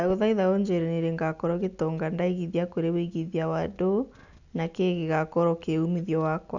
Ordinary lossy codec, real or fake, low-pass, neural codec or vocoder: none; real; 7.2 kHz; none